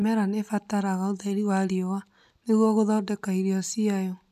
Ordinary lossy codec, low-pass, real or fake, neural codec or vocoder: none; 14.4 kHz; real; none